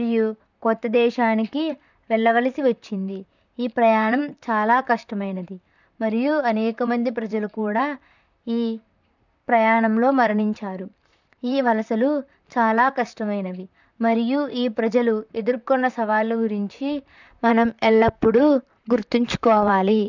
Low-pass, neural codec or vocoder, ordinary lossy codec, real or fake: 7.2 kHz; vocoder, 44.1 kHz, 128 mel bands, Pupu-Vocoder; none; fake